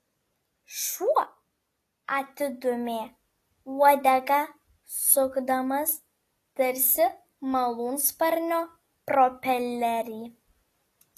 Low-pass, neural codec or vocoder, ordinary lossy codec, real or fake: 14.4 kHz; none; AAC, 48 kbps; real